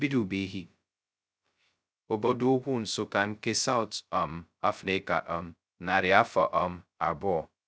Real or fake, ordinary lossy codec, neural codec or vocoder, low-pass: fake; none; codec, 16 kHz, 0.2 kbps, FocalCodec; none